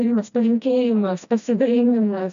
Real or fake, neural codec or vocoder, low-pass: fake; codec, 16 kHz, 1 kbps, FreqCodec, smaller model; 7.2 kHz